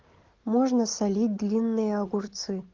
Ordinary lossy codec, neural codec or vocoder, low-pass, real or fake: Opus, 16 kbps; none; 7.2 kHz; real